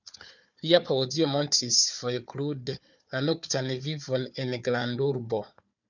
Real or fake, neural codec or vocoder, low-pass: fake; codec, 16 kHz, 4 kbps, FunCodec, trained on Chinese and English, 50 frames a second; 7.2 kHz